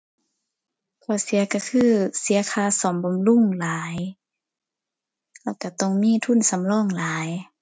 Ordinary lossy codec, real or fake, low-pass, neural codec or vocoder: none; real; none; none